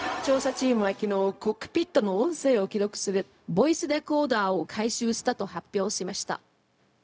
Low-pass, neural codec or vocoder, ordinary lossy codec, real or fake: none; codec, 16 kHz, 0.4 kbps, LongCat-Audio-Codec; none; fake